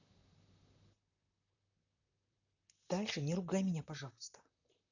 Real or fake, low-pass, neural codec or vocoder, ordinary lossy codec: fake; 7.2 kHz; vocoder, 44.1 kHz, 128 mel bands, Pupu-Vocoder; none